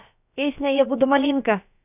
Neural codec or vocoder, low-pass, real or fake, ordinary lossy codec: codec, 16 kHz, about 1 kbps, DyCAST, with the encoder's durations; 3.6 kHz; fake; none